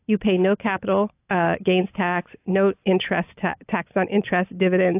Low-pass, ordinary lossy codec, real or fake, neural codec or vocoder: 3.6 kHz; AAC, 32 kbps; real; none